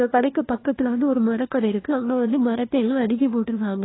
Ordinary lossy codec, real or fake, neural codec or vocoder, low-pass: AAC, 16 kbps; fake; codec, 16 kHz, 1 kbps, FunCodec, trained on LibriTTS, 50 frames a second; 7.2 kHz